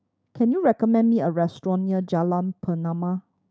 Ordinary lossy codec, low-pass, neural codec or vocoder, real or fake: none; none; codec, 16 kHz, 6 kbps, DAC; fake